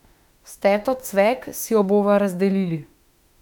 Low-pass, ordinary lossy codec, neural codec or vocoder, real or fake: 19.8 kHz; none; autoencoder, 48 kHz, 32 numbers a frame, DAC-VAE, trained on Japanese speech; fake